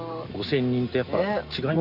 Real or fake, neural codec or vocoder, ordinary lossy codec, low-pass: real; none; none; 5.4 kHz